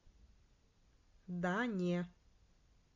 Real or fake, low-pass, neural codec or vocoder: real; 7.2 kHz; none